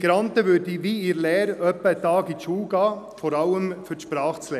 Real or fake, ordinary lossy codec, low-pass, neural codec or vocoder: real; none; 14.4 kHz; none